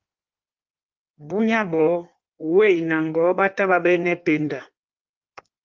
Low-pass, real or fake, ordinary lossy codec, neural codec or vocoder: 7.2 kHz; fake; Opus, 24 kbps; codec, 16 kHz in and 24 kHz out, 1.1 kbps, FireRedTTS-2 codec